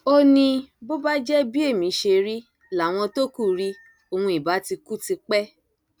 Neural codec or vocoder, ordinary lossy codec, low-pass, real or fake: none; none; none; real